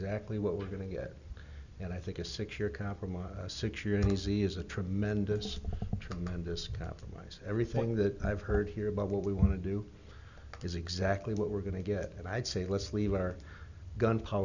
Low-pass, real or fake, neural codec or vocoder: 7.2 kHz; real; none